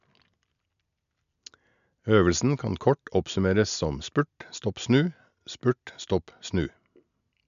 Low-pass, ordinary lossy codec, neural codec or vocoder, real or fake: 7.2 kHz; none; none; real